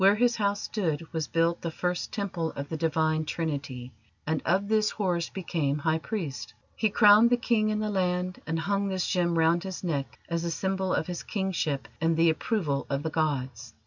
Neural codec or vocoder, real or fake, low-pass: none; real; 7.2 kHz